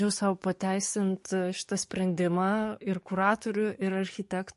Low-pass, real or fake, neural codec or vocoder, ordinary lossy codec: 14.4 kHz; fake; codec, 44.1 kHz, 7.8 kbps, DAC; MP3, 48 kbps